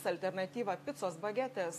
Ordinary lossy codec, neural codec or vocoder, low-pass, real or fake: AAC, 64 kbps; none; 14.4 kHz; real